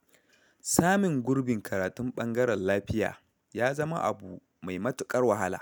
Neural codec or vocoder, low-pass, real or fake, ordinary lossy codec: none; none; real; none